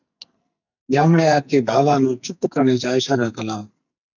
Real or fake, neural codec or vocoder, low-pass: fake; codec, 44.1 kHz, 2.6 kbps, SNAC; 7.2 kHz